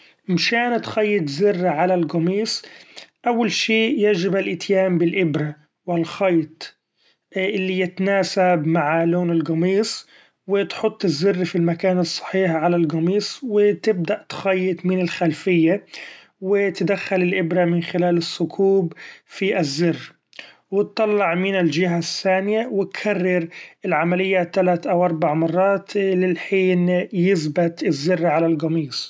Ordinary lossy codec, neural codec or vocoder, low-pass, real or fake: none; none; none; real